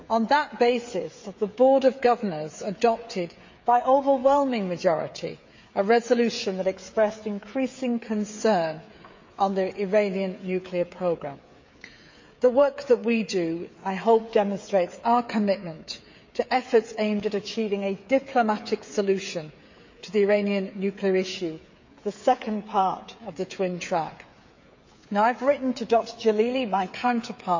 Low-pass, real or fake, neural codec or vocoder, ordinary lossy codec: 7.2 kHz; fake; codec, 16 kHz, 16 kbps, FreqCodec, smaller model; MP3, 48 kbps